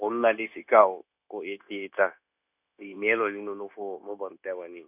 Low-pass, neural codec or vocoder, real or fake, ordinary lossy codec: 3.6 kHz; codec, 16 kHz, 0.9 kbps, LongCat-Audio-Codec; fake; none